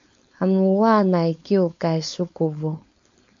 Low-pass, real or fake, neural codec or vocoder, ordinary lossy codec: 7.2 kHz; fake; codec, 16 kHz, 4.8 kbps, FACodec; AAC, 64 kbps